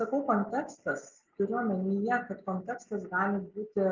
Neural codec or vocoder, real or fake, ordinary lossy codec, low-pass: none; real; Opus, 32 kbps; 7.2 kHz